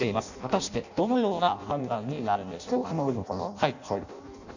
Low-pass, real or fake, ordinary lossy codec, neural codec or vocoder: 7.2 kHz; fake; none; codec, 16 kHz in and 24 kHz out, 0.6 kbps, FireRedTTS-2 codec